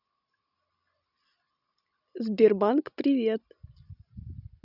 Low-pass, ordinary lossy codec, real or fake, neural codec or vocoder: 5.4 kHz; none; real; none